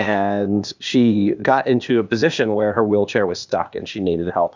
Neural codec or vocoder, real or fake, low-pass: codec, 16 kHz, 0.8 kbps, ZipCodec; fake; 7.2 kHz